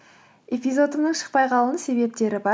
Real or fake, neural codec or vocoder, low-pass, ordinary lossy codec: real; none; none; none